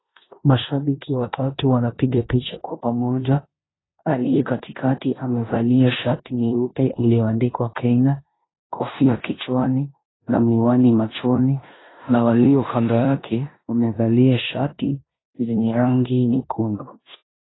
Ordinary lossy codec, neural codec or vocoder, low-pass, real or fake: AAC, 16 kbps; codec, 16 kHz in and 24 kHz out, 0.9 kbps, LongCat-Audio-Codec, four codebook decoder; 7.2 kHz; fake